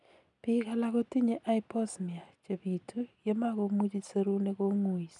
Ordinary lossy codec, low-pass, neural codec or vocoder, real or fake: none; 10.8 kHz; none; real